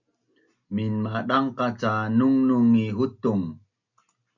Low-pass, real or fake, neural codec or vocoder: 7.2 kHz; real; none